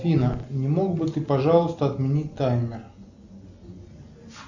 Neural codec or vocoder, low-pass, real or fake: none; 7.2 kHz; real